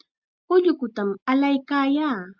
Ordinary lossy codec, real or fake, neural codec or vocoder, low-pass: Opus, 64 kbps; real; none; 7.2 kHz